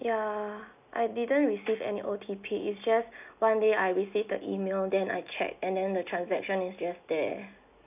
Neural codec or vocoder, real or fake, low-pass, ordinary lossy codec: none; real; 3.6 kHz; none